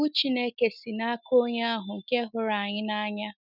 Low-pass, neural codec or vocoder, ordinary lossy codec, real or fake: 5.4 kHz; none; none; real